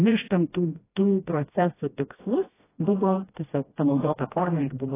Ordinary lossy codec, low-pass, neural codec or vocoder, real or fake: AAC, 16 kbps; 3.6 kHz; codec, 16 kHz, 1 kbps, FreqCodec, smaller model; fake